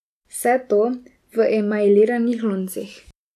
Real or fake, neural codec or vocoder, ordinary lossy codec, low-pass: real; none; none; 14.4 kHz